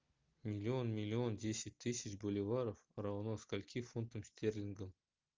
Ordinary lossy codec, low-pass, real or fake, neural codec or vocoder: Opus, 32 kbps; 7.2 kHz; real; none